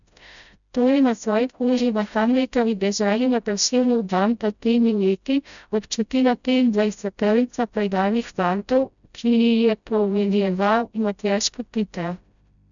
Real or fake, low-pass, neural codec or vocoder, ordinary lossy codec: fake; 7.2 kHz; codec, 16 kHz, 0.5 kbps, FreqCodec, smaller model; none